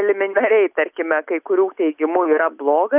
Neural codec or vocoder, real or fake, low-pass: none; real; 3.6 kHz